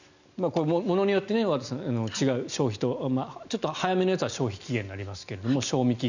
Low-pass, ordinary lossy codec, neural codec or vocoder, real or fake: 7.2 kHz; none; none; real